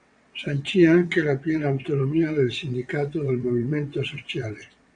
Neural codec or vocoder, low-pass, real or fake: vocoder, 22.05 kHz, 80 mel bands, WaveNeXt; 9.9 kHz; fake